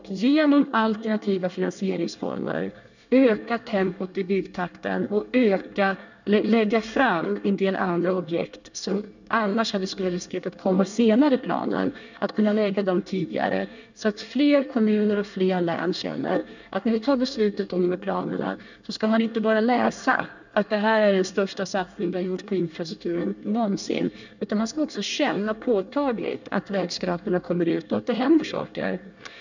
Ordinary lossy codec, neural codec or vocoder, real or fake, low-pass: none; codec, 24 kHz, 1 kbps, SNAC; fake; 7.2 kHz